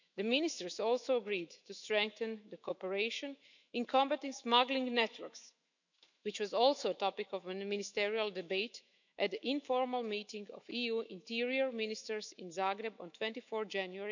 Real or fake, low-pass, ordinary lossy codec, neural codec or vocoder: fake; 7.2 kHz; none; autoencoder, 48 kHz, 128 numbers a frame, DAC-VAE, trained on Japanese speech